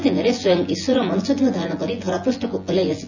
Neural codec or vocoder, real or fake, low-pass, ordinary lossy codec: vocoder, 24 kHz, 100 mel bands, Vocos; fake; 7.2 kHz; none